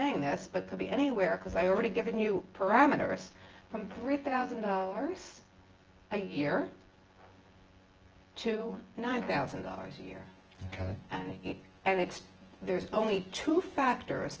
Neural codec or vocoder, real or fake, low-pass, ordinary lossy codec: vocoder, 24 kHz, 100 mel bands, Vocos; fake; 7.2 kHz; Opus, 16 kbps